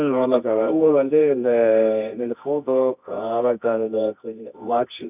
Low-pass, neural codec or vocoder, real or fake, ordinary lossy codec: 3.6 kHz; codec, 24 kHz, 0.9 kbps, WavTokenizer, medium music audio release; fake; none